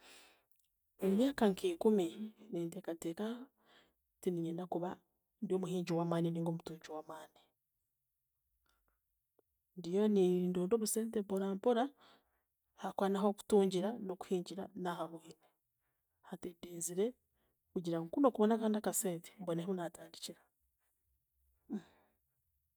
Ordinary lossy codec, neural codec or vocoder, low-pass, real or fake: none; none; none; real